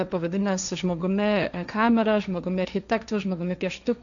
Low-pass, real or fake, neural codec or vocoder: 7.2 kHz; fake; codec, 16 kHz, 1.1 kbps, Voila-Tokenizer